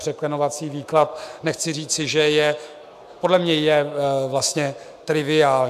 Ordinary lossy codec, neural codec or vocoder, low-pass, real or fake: AAC, 96 kbps; autoencoder, 48 kHz, 128 numbers a frame, DAC-VAE, trained on Japanese speech; 14.4 kHz; fake